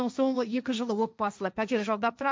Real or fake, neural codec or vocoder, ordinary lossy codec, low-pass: fake; codec, 16 kHz, 1.1 kbps, Voila-Tokenizer; none; none